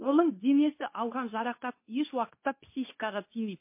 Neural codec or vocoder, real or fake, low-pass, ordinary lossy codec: codec, 24 kHz, 0.9 kbps, WavTokenizer, medium speech release version 2; fake; 3.6 kHz; MP3, 24 kbps